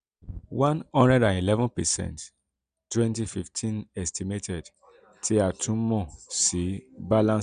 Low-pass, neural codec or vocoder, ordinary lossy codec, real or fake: 9.9 kHz; none; none; real